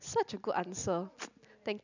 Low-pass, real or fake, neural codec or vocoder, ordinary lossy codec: 7.2 kHz; real; none; none